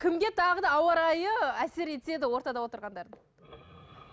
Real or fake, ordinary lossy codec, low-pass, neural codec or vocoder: real; none; none; none